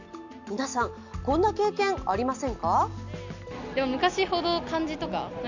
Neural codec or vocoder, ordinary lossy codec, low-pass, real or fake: none; none; 7.2 kHz; real